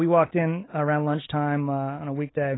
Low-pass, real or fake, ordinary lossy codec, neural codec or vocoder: 7.2 kHz; real; AAC, 16 kbps; none